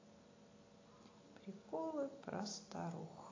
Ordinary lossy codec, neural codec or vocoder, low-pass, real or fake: AAC, 32 kbps; none; 7.2 kHz; real